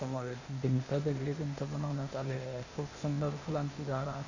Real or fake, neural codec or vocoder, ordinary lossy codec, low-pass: fake; codec, 16 kHz, 0.8 kbps, ZipCodec; none; 7.2 kHz